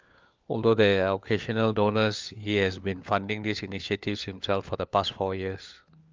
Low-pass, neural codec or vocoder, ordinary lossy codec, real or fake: 7.2 kHz; codec, 16 kHz, 4 kbps, FunCodec, trained on LibriTTS, 50 frames a second; Opus, 24 kbps; fake